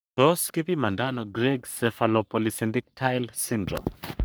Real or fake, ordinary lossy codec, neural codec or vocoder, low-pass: fake; none; codec, 44.1 kHz, 3.4 kbps, Pupu-Codec; none